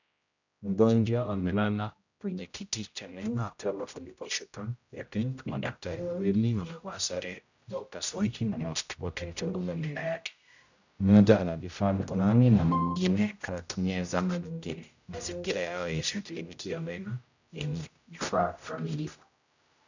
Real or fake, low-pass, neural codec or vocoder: fake; 7.2 kHz; codec, 16 kHz, 0.5 kbps, X-Codec, HuBERT features, trained on general audio